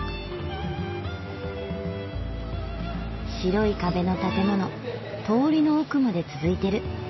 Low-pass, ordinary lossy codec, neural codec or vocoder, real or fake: 7.2 kHz; MP3, 24 kbps; none; real